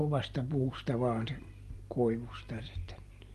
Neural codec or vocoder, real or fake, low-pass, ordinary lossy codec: none; real; 14.4 kHz; Opus, 32 kbps